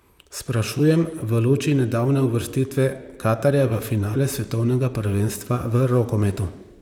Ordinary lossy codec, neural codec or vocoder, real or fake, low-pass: none; vocoder, 44.1 kHz, 128 mel bands, Pupu-Vocoder; fake; 19.8 kHz